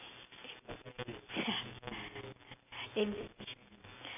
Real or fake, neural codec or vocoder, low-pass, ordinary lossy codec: real; none; 3.6 kHz; none